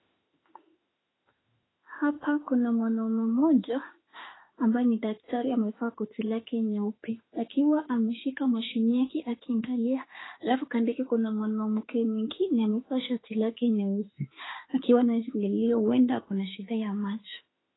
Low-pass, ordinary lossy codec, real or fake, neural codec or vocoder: 7.2 kHz; AAC, 16 kbps; fake; autoencoder, 48 kHz, 32 numbers a frame, DAC-VAE, trained on Japanese speech